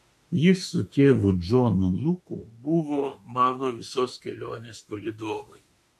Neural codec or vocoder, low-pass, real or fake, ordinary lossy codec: autoencoder, 48 kHz, 32 numbers a frame, DAC-VAE, trained on Japanese speech; 14.4 kHz; fake; AAC, 64 kbps